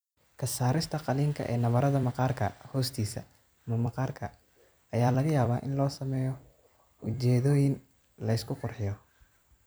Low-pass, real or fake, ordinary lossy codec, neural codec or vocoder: none; fake; none; vocoder, 44.1 kHz, 128 mel bands every 256 samples, BigVGAN v2